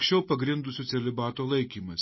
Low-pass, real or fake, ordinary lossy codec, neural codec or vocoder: 7.2 kHz; real; MP3, 24 kbps; none